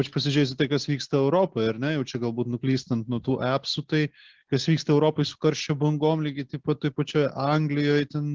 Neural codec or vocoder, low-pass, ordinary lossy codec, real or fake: none; 7.2 kHz; Opus, 16 kbps; real